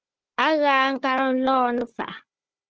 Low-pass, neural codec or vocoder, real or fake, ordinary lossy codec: 7.2 kHz; codec, 16 kHz, 4 kbps, FunCodec, trained on Chinese and English, 50 frames a second; fake; Opus, 16 kbps